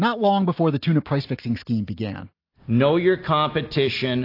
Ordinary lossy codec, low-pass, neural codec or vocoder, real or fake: AAC, 32 kbps; 5.4 kHz; none; real